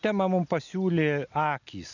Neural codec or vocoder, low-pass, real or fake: none; 7.2 kHz; real